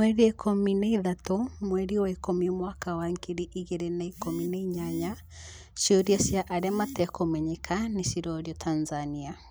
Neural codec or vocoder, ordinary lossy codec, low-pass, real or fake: none; none; none; real